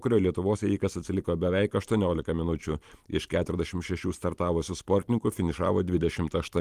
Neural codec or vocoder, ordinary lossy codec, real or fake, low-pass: vocoder, 44.1 kHz, 128 mel bands every 512 samples, BigVGAN v2; Opus, 24 kbps; fake; 14.4 kHz